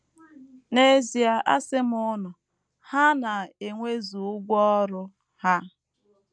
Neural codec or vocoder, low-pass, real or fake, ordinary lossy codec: none; 9.9 kHz; real; none